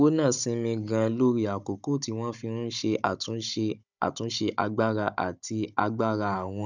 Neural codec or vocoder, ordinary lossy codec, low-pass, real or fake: codec, 16 kHz, 16 kbps, FunCodec, trained on Chinese and English, 50 frames a second; none; 7.2 kHz; fake